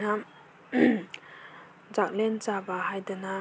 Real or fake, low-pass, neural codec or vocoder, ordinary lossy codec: real; none; none; none